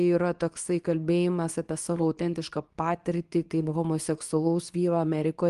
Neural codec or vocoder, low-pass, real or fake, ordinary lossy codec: codec, 24 kHz, 0.9 kbps, WavTokenizer, medium speech release version 2; 10.8 kHz; fake; Opus, 24 kbps